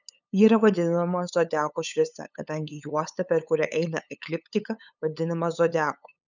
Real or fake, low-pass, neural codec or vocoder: fake; 7.2 kHz; codec, 16 kHz, 8 kbps, FunCodec, trained on LibriTTS, 25 frames a second